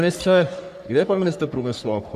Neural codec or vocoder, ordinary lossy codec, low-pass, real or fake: codec, 44.1 kHz, 3.4 kbps, Pupu-Codec; AAC, 96 kbps; 14.4 kHz; fake